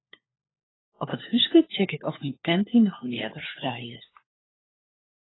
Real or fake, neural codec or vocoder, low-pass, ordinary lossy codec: fake; codec, 16 kHz, 4 kbps, FunCodec, trained on LibriTTS, 50 frames a second; 7.2 kHz; AAC, 16 kbps